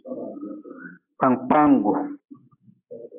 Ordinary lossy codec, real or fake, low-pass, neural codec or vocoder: AAC, 24 kbps; real; 3.6 kHz; none